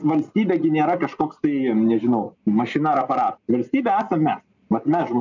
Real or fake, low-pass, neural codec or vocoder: real; 7.2 kHz; none